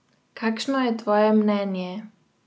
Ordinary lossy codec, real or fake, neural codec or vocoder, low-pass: none; real; none; none